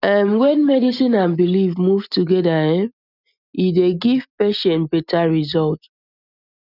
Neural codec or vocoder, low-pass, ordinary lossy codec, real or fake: none; 5.4 kHz; none; real